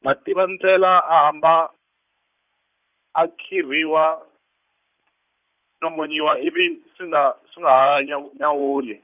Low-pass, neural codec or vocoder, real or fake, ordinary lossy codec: 3.6 kHz; codec, 16 kHz in and 24 kHz out, 2.2 kbps, FireRedTTS-2 codec; fake; none